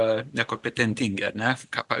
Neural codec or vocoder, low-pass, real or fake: none; 10.8 kHz; real